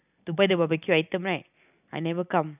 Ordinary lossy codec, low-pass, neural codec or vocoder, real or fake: none; 3.6 kHz; none; real